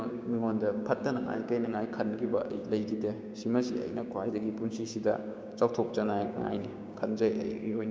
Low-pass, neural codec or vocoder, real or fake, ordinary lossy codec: none; codec, 16 kHz, 6 kbps, DAC; fake; none